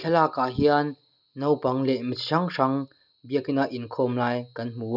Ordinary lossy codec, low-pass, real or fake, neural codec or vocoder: none; 5.4 kHz; real; none